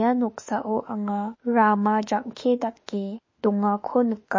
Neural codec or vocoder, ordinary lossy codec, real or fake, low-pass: autoencoder, 48 kHz, 32 numbers a frame, DAC-VAE, trained on Japanese speech; MP3, 32 kbps; fake; 7.2 kHz